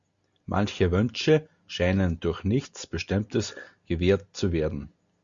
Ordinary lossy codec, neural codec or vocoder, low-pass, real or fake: Opus, 64 kbps; none; 7.2 kHz; real